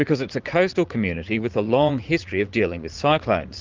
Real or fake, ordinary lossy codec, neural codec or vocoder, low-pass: fake; Opus, 24 kbps; vocoder, 44.1 kHz, 80 mel bands, Vocos; 7.2 kHz